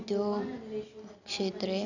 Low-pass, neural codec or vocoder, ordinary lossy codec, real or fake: 7.2 kHz; none; none; real